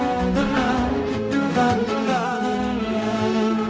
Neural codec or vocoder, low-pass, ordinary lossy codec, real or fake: codec, 16 kHz, 0.5 kbps, X-Codec, HuBERT features, trained on balanced general audio; none; none; fake